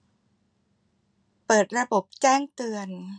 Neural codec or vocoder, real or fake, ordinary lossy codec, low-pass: none; real; none; 9.9 kHz